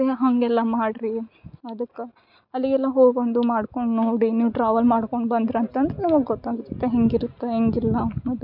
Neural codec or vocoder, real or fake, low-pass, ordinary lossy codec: none; real; 5.4 kHz; none